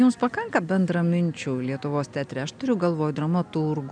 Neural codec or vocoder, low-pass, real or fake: none; 9.9 kHz; real